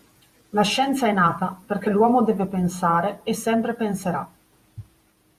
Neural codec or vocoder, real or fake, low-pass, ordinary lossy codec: vocoder, 48 kHz, 128 mel bands, Vocos; fake; 14.4 kHz; Opus, 64 kbps